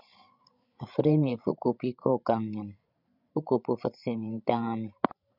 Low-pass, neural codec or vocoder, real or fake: 5.4 kHz; codec, 16 kHz, 16 kbps, FreqCodec, larger model; fake